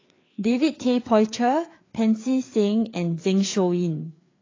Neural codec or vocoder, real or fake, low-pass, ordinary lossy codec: codec, 16 kHz, 4 kbps, FreqCodec, larger model; fake; 7.2 kHz; AAC, 32 kbps